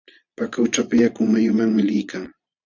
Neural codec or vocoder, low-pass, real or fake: vocoder, 22.05 kHz, 80 mel bands, Vocos; 7.2 kHz; fake